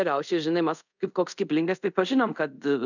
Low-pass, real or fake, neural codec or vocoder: 7.2 kHz; fake; codec, 24 kHz, 0.5 kbps, DualCodec